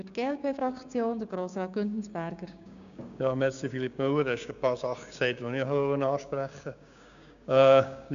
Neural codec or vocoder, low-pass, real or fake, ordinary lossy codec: codec, 16 kHz, 6 kbps, DAC; 7.2 kHz; fake; none